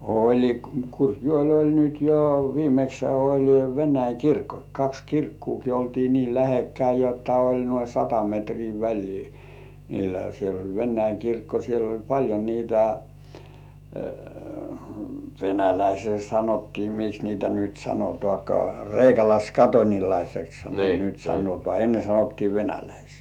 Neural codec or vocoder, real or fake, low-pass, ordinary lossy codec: autoencoder, 48 kHz, 128 numbers a frame, DAC-VAE, trained on Japanese speech; fake; 19.8 kHz; none